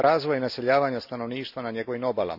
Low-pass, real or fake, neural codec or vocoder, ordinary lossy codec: 5.4 kHz; real; none; AAC, 48 kbps